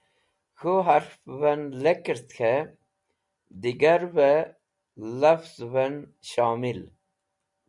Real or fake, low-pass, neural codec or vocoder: real; 10.8 kHz; none